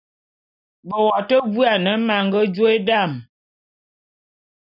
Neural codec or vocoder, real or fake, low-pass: none; real; 5.4 kHz